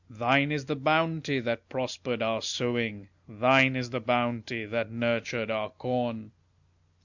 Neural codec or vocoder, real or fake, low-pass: none; real; 7.2 kHz